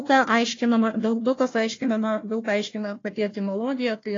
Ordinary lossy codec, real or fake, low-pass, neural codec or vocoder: AAC, 32 kbps; fake; 7.2 kHz; codec, 16 kHz, 1 kbps, FunCodec, trained on Chinese and English, 50 frames a second